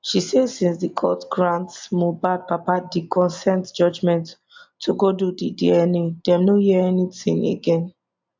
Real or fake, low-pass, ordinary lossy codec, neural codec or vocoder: real; 7.2 kHz; MP3, 64 kbps; none